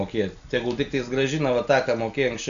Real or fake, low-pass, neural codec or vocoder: real; 7.2 kHz; none